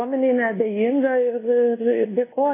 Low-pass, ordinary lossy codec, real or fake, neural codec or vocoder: 3.6 kHz; AAC, 16 kbps; fake; codec, 24 kHz, 1.2 kbps, DualCodec